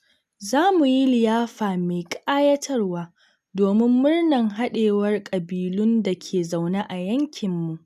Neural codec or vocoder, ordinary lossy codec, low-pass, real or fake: none; none; 14.4 kHz; real